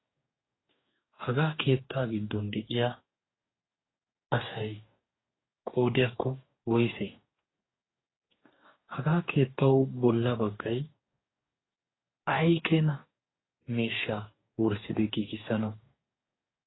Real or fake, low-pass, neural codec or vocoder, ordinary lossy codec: fake; 7.2 kHz; codec, 44.1 kHz, 2.6 kbps, DAC; AAC, 16 kbps